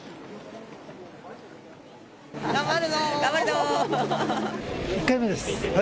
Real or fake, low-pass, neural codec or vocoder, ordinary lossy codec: real; none; none; none